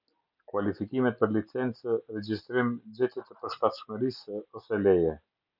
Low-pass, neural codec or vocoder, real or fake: 5.4 kHz; none; real